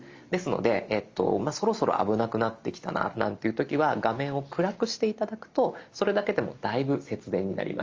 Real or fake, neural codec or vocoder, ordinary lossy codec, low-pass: real; none; Opus, 32 kbps; 7.2 kHz